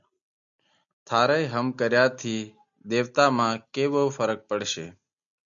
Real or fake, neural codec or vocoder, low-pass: real; none; 7.2 kHz